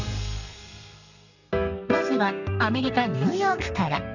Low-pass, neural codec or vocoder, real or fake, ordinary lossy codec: 7.2 kHz; codec, 32 kHz, 1.9 kbps, SNAC; fake; none